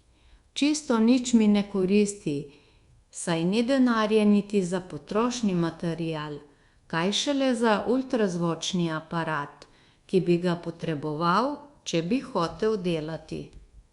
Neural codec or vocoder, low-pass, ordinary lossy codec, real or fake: codec, 24 kHz, 1.2 kbps, DualCodec; 10.8 kHz; Opus, 64 kbps; fake